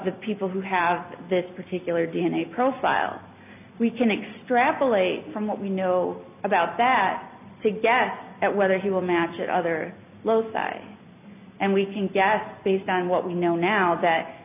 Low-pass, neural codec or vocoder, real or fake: 3.6 kHz; none; real